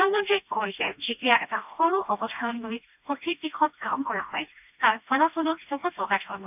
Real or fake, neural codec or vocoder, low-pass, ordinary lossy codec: fake; codec, 16 kHz, 1 kbps, FreqCodec, smaller model; 3.6 kHz; none